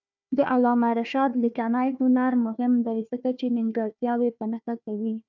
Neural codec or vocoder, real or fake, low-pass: codec, 16 kHz, 1 kbps, FunCodec, trained on Chinese and English, 50 frames a second; fake; 7.2 kHz